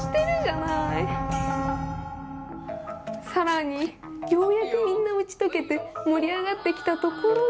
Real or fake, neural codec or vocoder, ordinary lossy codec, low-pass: real; none; none; none